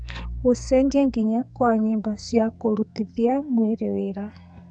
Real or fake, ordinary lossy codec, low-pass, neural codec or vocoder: fake; none; 9.9 kHz; codec, 44.1 kHz, 2.6 kbps, SNAC